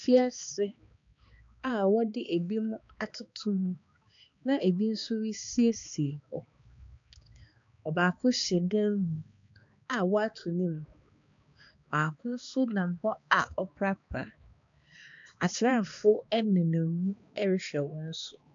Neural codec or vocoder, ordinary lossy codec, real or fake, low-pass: codec, 16 kHz, 2 kbps, X-Codec, HuBERT features, trained on balanced general audio; MP3, 64 kbps; fake; 7.2 kHz